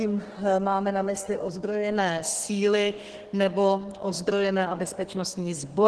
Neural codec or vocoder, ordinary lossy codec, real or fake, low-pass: codec, 32 kHz, 1.9 kbps, SNAC; Opus, 16 kbps; fake; 10.8 kHz